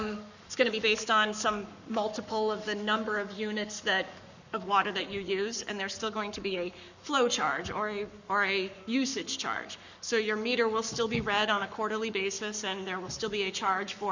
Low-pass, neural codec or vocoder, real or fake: 7.2 kHz; codec, 44.1 kHz, 7.8 kbps, Pupu-Codec; fake